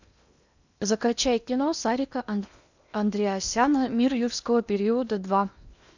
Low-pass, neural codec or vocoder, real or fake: 7.2 kHz; codec, 16 kHz in and 24 kHz out, 0.8 kbps, FocalCodec, streaming, 65536 codes; fake